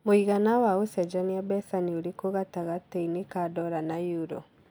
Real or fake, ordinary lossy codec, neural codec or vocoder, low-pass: real; none; none; none